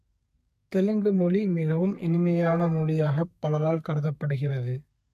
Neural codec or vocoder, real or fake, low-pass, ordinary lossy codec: codec, 32 kHz, 1.9 kbps, SNAC; fake; 14.4 kHz; MP3, 64 kbps